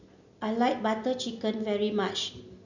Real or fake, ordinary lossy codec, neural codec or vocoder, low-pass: real; none; none; 7.2 kHz